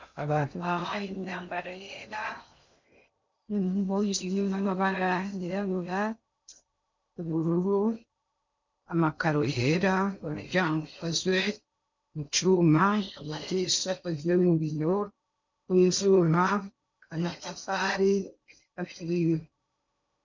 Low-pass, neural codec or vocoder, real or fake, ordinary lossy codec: 7.2 kHz; codec, 16 kHz in and 24 kHz out, 0.6 kbps, FocalCodec, streaming, 2048 codes; fake; MP3, 64 kbps